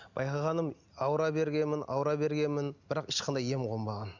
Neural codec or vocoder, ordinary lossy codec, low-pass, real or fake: none; none; 7.2 kHz; real